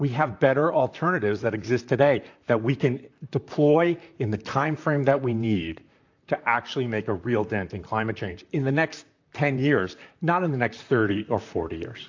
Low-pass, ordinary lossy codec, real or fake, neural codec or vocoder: 7.2 kHz; AAC, 48 kbps; fake; vocoder, 44.1 kHz, 128 mel bands, Pupu-Vocoder